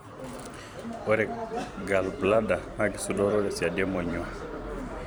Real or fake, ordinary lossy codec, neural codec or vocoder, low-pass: fake; none; vocoder, 44.1 kHz, 128 mel bands every 256 samples, BigVGAN v2; none